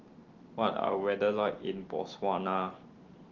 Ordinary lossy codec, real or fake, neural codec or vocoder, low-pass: Opus, 16 kbps; real; none; 7.2 kHz